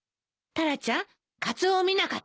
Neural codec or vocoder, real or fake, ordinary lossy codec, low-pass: none; real; none; none